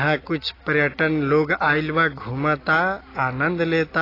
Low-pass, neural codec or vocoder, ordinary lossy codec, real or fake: 5.4 kHz; none; AAC, 24 kbps; real